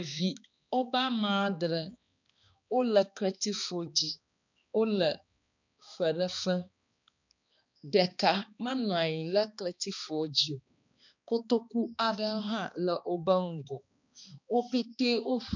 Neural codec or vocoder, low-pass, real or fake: codec, 16 kHz, 2 kbps, X-Codec, HuBERT features, trained on balanced general audio; 7.2 kHz; fake